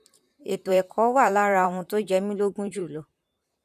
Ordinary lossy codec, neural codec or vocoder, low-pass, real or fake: none; vocoder, 44.1 kHz, 128 mel bands, Pupu-Vocoder; 14.4 kHz; fake